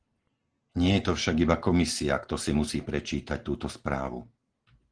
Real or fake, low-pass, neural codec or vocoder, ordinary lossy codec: real; 9.9 kHz; none; Opus, 16 kbps